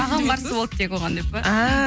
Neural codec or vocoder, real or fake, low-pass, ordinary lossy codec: none; real; none; none